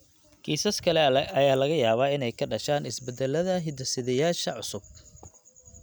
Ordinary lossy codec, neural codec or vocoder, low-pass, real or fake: none; none; none; real